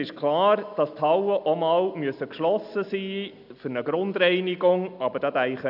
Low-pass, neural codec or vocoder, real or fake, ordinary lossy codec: 5.4 kHz; none; real; none